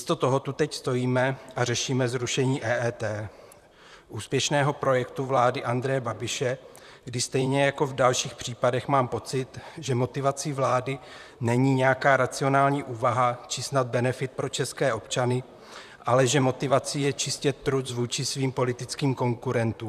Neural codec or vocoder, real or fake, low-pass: vocoder, 44.1 kHz, 128 mel bands, Pupu-Vocoder; fake; 14.4 kHz